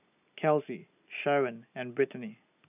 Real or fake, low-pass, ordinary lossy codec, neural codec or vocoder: real; 3.6 kHz; none; none